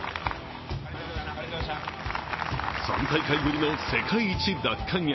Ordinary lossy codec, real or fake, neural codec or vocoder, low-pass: MP3, 24 kbps; real; none; 7.2 kHz